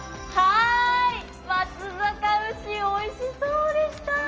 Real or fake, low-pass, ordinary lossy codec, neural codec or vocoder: real; 7.2 kHz; Opus, 24 kbps; none